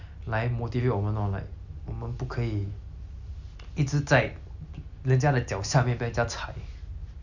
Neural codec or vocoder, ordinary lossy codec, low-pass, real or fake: none; none; 7.2 kHz; real